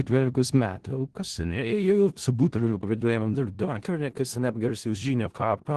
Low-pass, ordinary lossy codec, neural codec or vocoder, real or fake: 10.8 kHz; Opus, 16 kbps; codec, 16 kHz in and 24 kHz out, 0.4 kbps, LongCat-Audio-Codec, four codebook decoder; fake